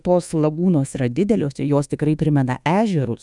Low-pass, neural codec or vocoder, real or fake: 10.8 kHz; codec, 24 kHz, 1.2 kbps, DualCodec; fake